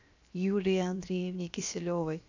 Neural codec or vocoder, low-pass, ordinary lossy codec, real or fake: codec, 16 kHz, 0.7 kbps, FocalCodec; 7.2 kHz; AAC, 48 kbps; fake